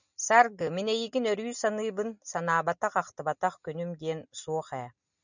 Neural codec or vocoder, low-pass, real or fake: none; 7.2 kHz; real